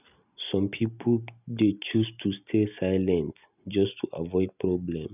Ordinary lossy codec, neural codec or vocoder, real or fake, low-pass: none; none; real; 3.6 kHz